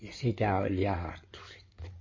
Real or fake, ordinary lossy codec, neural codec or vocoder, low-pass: fake; MP3, 32 kbps; codec, 16 kHz in and 24 kHz out, 2.2 kbps, FireRedTTS-2 codec; 7.2 kHz